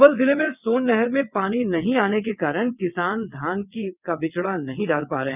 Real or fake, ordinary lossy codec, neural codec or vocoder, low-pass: fake; none; vocoder, 22.05 kHz, 80 mel bands, WaveNeXt; 3.6 kHz